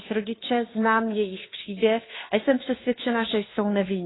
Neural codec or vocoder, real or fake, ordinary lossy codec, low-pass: codec, 16 kHz, 2 kbps, FunCodec, trained on Chinese and English, 25 frames a second; fake; AAC, 16 kbps; 7.2 kHz